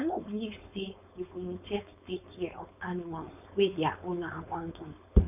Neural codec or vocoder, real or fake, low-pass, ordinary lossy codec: codec, 16 kHz, 4.8 kbps, FACodec; fake; 3.6 kHz; none